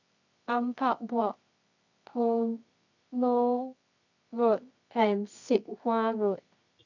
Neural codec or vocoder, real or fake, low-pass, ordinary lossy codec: codec, 24 kHz, 0.9 kbps, WavTokenizer, medium music audio release; fake; 7.2 kHz; none